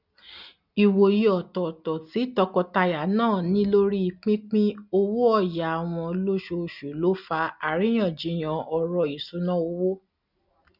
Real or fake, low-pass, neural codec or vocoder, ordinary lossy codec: real; 5.4 kHz; none; none